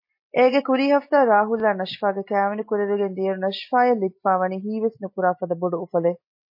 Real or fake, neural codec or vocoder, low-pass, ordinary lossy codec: real; none; 5.4 kHz; MP3, 24 kbps